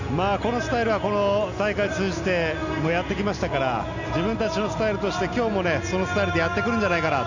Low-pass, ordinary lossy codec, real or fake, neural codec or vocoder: 7.2 kHz; none; real; none